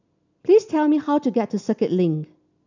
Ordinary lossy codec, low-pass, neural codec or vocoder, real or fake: none; 7.2 kHz; none; real